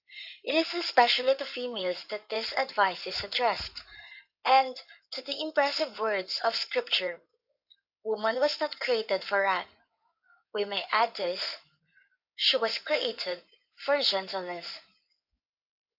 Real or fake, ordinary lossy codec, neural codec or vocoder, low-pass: fake; AAC, 48 kbps; codec, 16 kHz in and 24 kHz out, 2.2 kbps, FireRedTTS-2 codec; 5.4 kHz